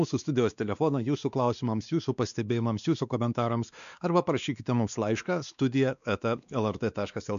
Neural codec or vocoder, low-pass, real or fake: codec, 16 kHz, 2 kbps, X-Codec, WavLM features, trained on Multilingual LibriSpeech; 7.2 kHz; fake